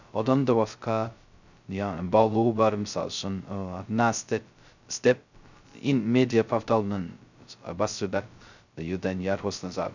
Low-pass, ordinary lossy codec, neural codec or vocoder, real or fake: 7.2 kHz; none; codec, 16 kHz, 0.2 kbps, FocalCodec; fake